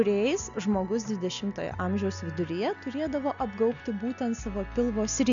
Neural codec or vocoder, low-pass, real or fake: none; 7.2 kHz; real